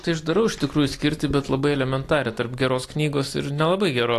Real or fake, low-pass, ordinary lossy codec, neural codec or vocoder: real; 14.4 kHz; AAC, 48 kbps; none